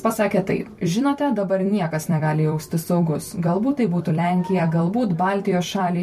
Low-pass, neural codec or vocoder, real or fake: 14.4 kHz; vocoder, 44.1 kHz, 128 mel bands every 256 samples, BigVGAN v2; fake